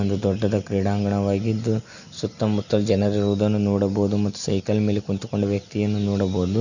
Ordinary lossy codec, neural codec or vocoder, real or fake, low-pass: none; none; real; 7.2 kHz